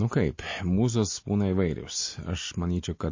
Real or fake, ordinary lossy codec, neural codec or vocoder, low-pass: real; MP3, 32 kbps; none; 7.2 kHz